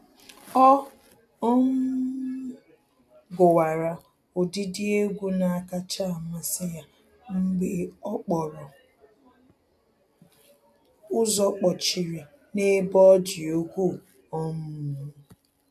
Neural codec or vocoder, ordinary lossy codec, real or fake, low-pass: none; AAC, 96 kbps; real; 14.4 kHz